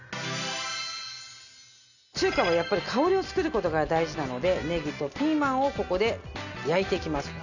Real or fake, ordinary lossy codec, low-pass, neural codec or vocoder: real; none; 7.2 kHz; none